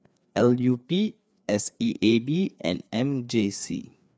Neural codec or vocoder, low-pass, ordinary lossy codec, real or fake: codec, 16 kHz, 4 kbps, FreqCodec, larger model; none; none; fake